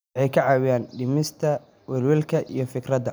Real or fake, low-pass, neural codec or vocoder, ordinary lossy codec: real; none; none; none